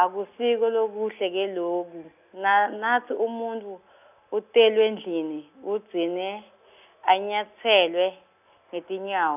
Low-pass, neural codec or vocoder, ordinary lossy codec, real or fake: 3.6 kHz; none; none; real